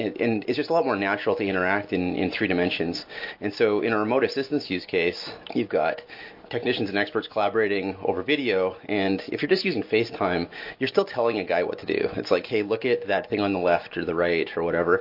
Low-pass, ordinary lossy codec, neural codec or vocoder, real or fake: 5.4 kHz; MP3, 32 kbps; none; real